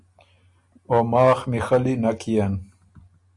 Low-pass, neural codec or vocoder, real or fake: 10.8 kHz; none; real